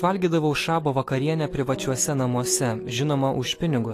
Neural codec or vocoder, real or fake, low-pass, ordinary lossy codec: autoencoder, 48 kHz, 128 numbers a frame, DAC-VAE, trained on Japanese speech; fake; 14.4 kHz; AAC, 48 kbps